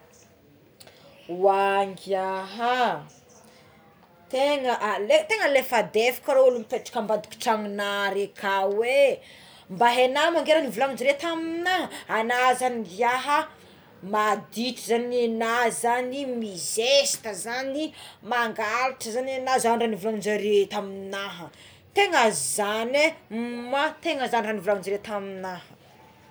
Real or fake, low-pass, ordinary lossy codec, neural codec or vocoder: real; none; none; none